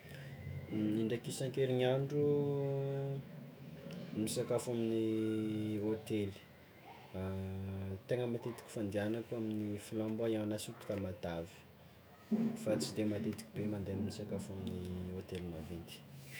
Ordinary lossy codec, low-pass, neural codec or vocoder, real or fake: none; none; autoencoder, 48 kHz, 128 numbers a frame, DAC-VAE, trained on Japanese speech; fake